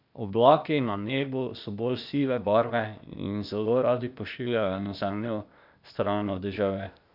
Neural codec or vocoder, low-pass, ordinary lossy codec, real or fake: codec, 16 kHz, 0.8 kbps, ZipCodec; 5.4 kHz; none; fake